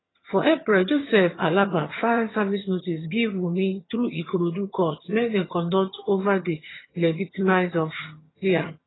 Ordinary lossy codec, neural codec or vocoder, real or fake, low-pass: AAC, 16 kbps; vocoder, 22.05 kHz, 80 mel bands, HiFi-GAN; fake; 7.2 kHz